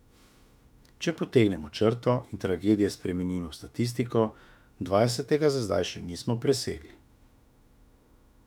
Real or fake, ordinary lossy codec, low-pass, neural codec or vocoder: fake; none; 19.8 kHz; autoencoder, 48 kHz, 32 numbers a frame, DAC-VAE, trained on Japanese speech